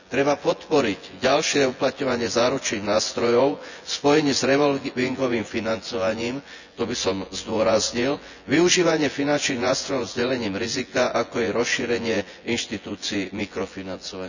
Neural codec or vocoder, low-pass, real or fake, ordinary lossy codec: vocoder, 24 kHz, 100 mel bands, Vocos; 7.2 kHz; fake; none